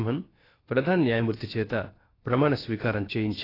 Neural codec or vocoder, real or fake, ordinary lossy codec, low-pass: codec, 16 kHz, about 1 kbps, DyCAST, with the encoder's durations; fake; AAC, 24 kbps; 5.4 kHz